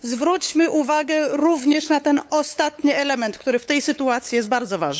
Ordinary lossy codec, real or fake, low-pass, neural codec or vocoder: none; fake; none; codec, 16 kHz, 8 kbps, FunCodec, trained on LibriTTS, 25 frames a second